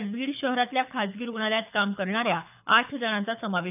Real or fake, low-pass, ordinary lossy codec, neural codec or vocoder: fake; 3.6 kHz; none; codec, 24 kHz, 6 kbps, HILCodec